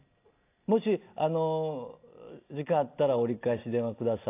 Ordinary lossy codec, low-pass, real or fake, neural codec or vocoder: AAC, 24 kbps; 3.6 kHz; real; none